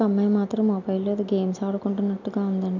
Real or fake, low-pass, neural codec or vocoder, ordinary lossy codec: real; 7.2 kHz; none; none